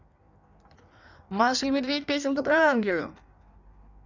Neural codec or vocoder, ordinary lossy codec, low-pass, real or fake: codec, 16 kHz in and 24 kHz out, 1.1 kbps, FireRedTTS-2 codec; none; 7.2 kHz; fake